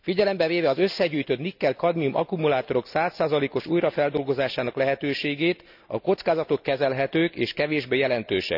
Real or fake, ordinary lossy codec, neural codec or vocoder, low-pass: real; none; none; 5.4 kHz